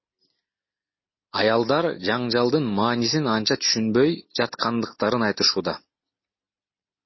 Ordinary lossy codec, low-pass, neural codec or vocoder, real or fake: MP3, 24 kbps; 7.2 kHz; none; real